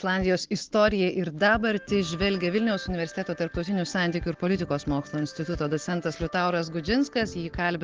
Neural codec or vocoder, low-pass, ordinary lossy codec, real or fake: none; 7.2 kHz; Opus, 16 kbps; real